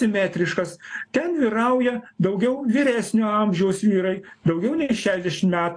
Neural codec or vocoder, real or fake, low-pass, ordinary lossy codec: none; real; 9.9 kHz; AAC, 48 kbps